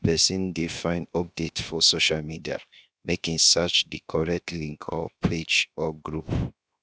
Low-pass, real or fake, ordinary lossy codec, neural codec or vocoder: none; fake; none; codec, 16 kHz, 0.7 kbps, FocalCodec